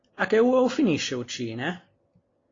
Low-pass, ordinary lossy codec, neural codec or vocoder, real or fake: 7.2 kHz; AAC, 32 kbps; none; real